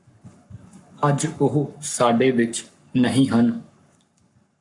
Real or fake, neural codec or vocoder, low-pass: fake; codec, 44.1 kHz, 7.8 kbps, Pupu-Codec; 10.8 kHz